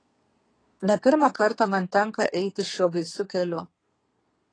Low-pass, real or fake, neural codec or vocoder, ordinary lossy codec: 9.9 kHz; fake; codec, 32 kHz, 1.9 kbps, SNAC; AAC, 32 kbps